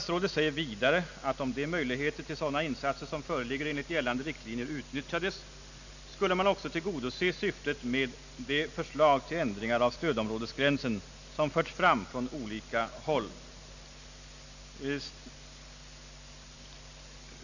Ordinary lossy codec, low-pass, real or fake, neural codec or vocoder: none; 7.2 kHz; real; none